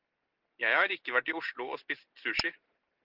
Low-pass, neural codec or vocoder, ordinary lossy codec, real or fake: 5.4 kHz; none; Opus, 16 kbps; real